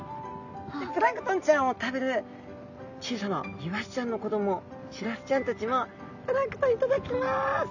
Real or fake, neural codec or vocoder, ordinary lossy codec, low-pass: real; none; none; 7.2 kHz